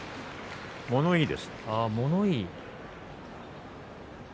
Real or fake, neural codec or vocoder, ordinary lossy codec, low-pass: real; none; none; none